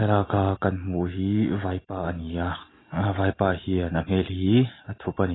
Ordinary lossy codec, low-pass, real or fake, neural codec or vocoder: AAC, 16 kbps; 7.2 kHz; real; none